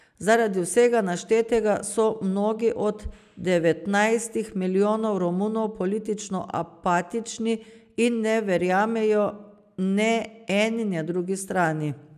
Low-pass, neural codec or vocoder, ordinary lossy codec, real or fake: 14.4 kHz; none; none; real